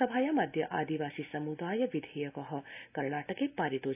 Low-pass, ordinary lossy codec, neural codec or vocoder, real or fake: 3.6 kHz; AAC, 32 kbps; none; real